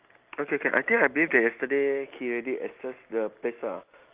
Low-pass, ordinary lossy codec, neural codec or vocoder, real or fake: 3.6 kHz; Opus, 32 kbps; none; real